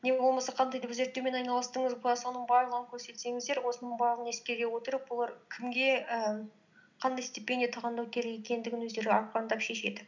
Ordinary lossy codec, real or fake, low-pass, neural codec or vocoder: none; real; 7.2 kHz; none